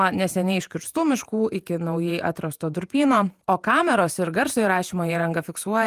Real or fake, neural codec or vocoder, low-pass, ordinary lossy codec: fake; vocoder, 48 kHz, 128 mel bands, Vocos; 14.4 kHz; Opus, 32 kbps